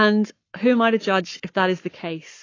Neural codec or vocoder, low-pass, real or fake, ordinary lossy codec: codec, 44.1 kHz, 7.8 kbps, Pupu-Codec; 7.2 kHz; fake; AAC, 32 kbps